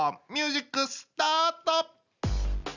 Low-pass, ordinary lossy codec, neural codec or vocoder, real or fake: 7.2 kHz; none; none; real